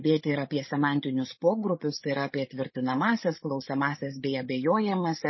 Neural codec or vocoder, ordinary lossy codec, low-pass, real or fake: codec, 16 kHz, 16 kbps, FreqCodec, larger model; MP3, 24 kbps; 7.2 kHz; fake